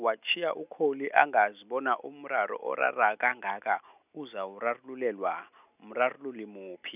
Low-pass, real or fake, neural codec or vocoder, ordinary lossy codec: 3.6 kHz; real; none; none